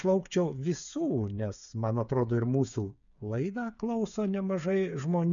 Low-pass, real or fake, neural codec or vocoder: 7.2 kHz; fake; codec, 16 kHz, 8 kbps, FreqCodec, smaller model